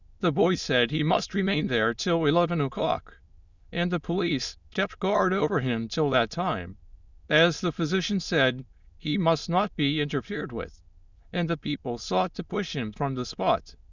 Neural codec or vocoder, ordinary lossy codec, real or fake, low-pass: autoencoder, 22.05 kHz, a latent of 192 numbers a frame, VITS, trained on many speakers; Opus, 64 kbps; fake; 7.2 kHz